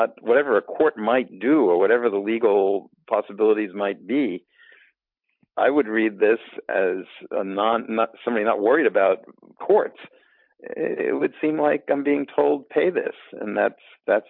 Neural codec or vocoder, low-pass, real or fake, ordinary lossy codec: none; 5.4 kHz; real; MP3, 48 kbps